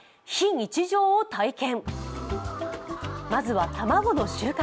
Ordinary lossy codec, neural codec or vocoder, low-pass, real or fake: none; none; none; real